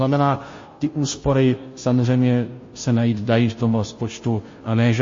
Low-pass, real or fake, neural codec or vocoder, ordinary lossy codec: 7.2 kHz; fake; codec, 16 kHz, 0.5 kbps, FunCodec, trained on Chinese and English, 25 frames a second; MP3, 32 kbps